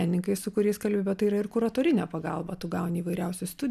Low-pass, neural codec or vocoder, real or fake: 14.4 kHz; none; real